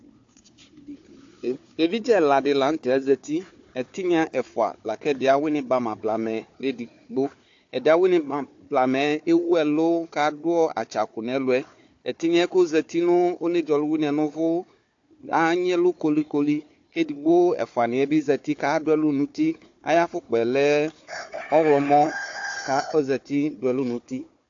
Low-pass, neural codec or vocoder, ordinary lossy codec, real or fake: 7.2 kHz; codec, 16 kHz, 4 kbps, FunCodec, trained on Chinese and English, 50 frames a second; AAC, 48 kbps; fake